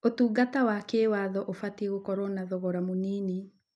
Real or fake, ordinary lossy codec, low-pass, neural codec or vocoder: real; none; none; none